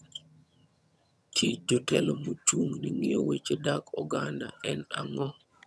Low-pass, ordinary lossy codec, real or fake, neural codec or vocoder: none; none; fake; vocoder, 22.05 kHz, 80 mel bands, HiFi-GAN